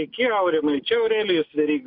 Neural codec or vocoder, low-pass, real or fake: none; 5.4 kHz; real